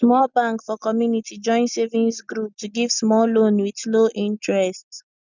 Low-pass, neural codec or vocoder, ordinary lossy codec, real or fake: 7.2 kHz; none; none; real